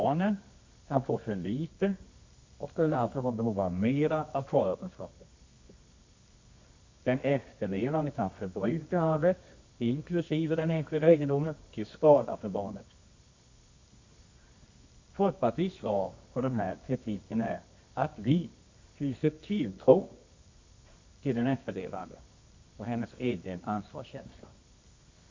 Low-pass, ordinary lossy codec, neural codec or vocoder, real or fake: 7.2 kHz; MP3, 48 kbps; codec, 24 kHz, 0.9 kbps, WavTokenizer, medium music audio release; fake